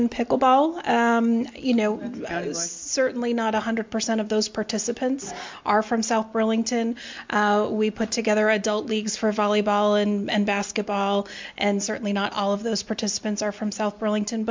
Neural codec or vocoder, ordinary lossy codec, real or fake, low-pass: none; MP3, 64 kbps; real; 7.2 kHz